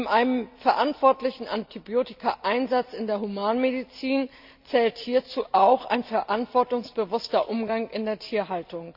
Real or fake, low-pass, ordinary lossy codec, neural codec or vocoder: real; 5.4 kHz; none; none